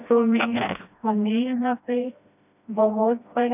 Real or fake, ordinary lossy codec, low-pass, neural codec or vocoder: fake; none; 3.6 kHz; codec, 16 kHz, 1 kbps, FreqCodec, smaller model